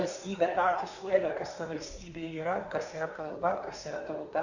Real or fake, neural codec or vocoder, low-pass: fake; codec, 24 kHz, 1 kbps, SNAC; 7.2 kHz